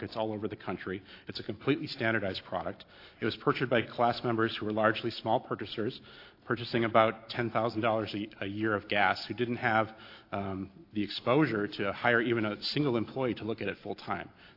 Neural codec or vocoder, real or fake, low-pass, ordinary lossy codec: none; real; 5.4 kHz; AAC, 32 kbps